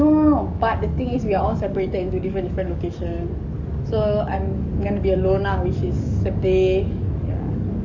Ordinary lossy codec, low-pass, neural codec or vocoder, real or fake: Opus, 64 kbps; 7.2 kHz; codec, 44.1 kHz, 7.8 kbps, DAC; fake